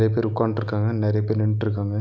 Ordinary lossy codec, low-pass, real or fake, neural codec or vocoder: none; none; real; none